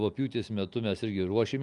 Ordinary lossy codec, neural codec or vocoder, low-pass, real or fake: Opus, 32 kbps; none; 10.8 kHz; real